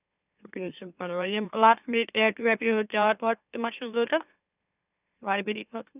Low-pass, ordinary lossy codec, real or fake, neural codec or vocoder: 3.6 kHz; none; fake; autoencoder, 44.1 kHz, a latent of 192 numbers a frame, MeloTTS